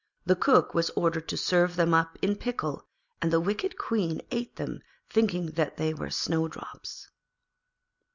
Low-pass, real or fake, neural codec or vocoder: 7.2 kHz; real; none